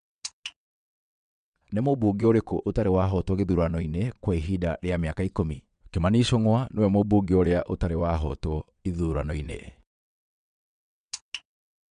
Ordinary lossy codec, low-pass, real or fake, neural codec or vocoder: MP3, 96 kbps; 9.9 kHz; fake; vocoder, 22.05 kHz, 80 mel bands, WaveNeXt